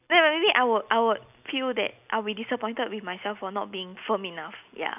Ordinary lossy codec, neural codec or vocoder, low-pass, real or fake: none; none; 3.6 kHz; real